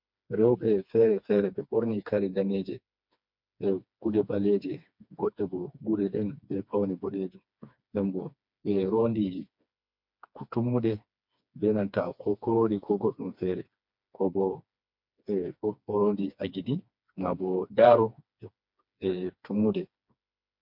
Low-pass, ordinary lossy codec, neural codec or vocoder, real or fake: 5.4 kHz; MP3, 48 kbps; codec, 16 kHz, 2 kbps, FreqCodec, smaller model; fake